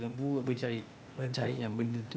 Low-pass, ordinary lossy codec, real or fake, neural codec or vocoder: none; none; fake; codec, 16 kHz, 0.8 kbps, ZipCodec